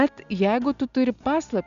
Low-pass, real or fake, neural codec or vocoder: 7.2 kHz; real; none